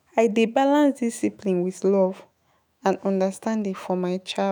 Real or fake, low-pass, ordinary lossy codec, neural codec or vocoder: fake; none; none; autoencoder, 48 kHz, 128 numbers a frame, DAC-VAE, trained on Japanese speech